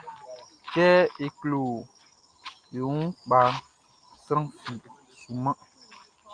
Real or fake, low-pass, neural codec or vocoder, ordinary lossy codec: real; 9.9 kHz; none; Opus, 32 kbps